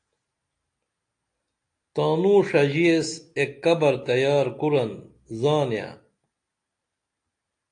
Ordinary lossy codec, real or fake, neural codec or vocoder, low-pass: AAC, 48 kbps; real; none; 9.9 kHz